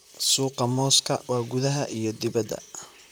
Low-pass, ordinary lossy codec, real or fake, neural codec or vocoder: none; none; fake; vocoder, 44.1 kHz, 128 mel bands, Pupu-Vocoder